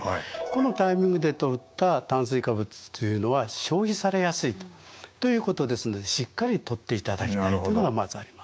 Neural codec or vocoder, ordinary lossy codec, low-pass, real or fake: codec, 16 kHz, 6 kbps, DAC; none; none; fake